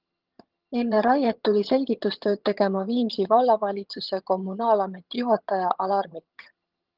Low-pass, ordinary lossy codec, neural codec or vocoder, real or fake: 5.4 kHz; Opus, 32 kbps; vocoder, 22.05 kHz, 80 mel bands, HiFi-GAN; fake